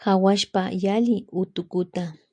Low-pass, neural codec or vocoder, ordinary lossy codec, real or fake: 9.9 kHz; none; MP3, 96 kbps; real